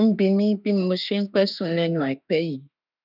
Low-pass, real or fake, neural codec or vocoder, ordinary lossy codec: 5.4 kHz; fake; autoencoder, 48 kHz, 32 numbers a frame, DAC-VAE, trained on Japanese speech; none